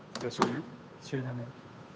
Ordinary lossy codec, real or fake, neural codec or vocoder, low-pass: none; fake; codec, 16 kHz, 8 kbps, FunCodec, trained on Chinese and English, 25 frames a second; none